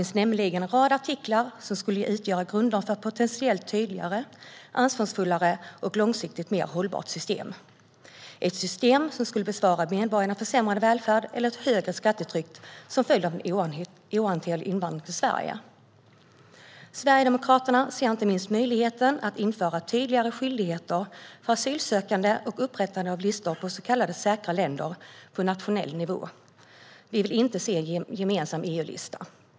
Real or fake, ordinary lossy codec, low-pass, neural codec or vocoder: real; none; none; none